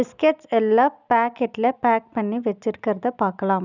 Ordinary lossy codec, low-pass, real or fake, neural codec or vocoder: none; 7.2 kHz; real; none